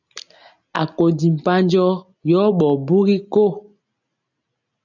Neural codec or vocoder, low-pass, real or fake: none; 7.2 kHz; real